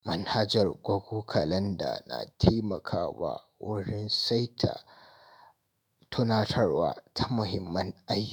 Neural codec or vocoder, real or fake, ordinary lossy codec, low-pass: autoencoder, 48 kHz, 128 numbers a frame, DAC-VAE, trained on Japanese speech; fake; none; none